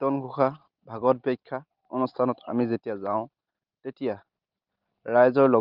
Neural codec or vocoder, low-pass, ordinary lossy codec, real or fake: none; 5.4 kHz; Opus, 32 kbps; real